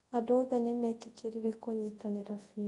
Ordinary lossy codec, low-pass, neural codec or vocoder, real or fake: AAC, 32 kbps; 10.8 kHz; codec, 24 kHz, 0.9 kbps, WavTokenizer, large speech release; fake